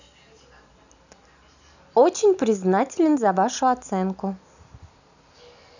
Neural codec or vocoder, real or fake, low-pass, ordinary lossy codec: none; real; 7.2 kHz; none